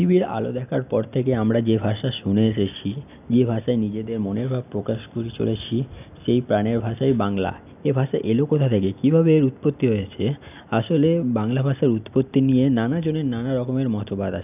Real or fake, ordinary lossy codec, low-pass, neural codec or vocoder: real; none; 3.6 kHz; none